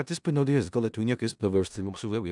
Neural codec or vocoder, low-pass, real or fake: codec, 16 kHz in and 24 kHz out, 0.4 kbps, LongCat-Audio-Codec, four codebook decoder; 10.8 kHz; fake